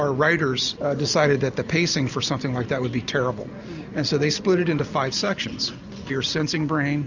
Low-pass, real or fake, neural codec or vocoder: 7.2 kHz; real; none